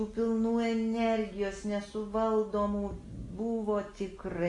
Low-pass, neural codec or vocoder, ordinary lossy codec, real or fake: 10.8 kHz; none; AAC, 32 kbps; real